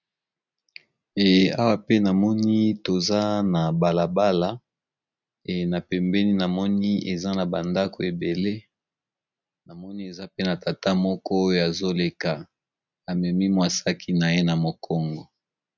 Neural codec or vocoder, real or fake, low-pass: none; real; 7.2 kHz